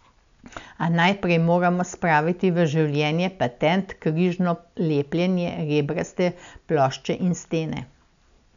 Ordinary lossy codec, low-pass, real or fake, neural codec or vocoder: MP3, 96 kbps; 7.2 kHz; real; none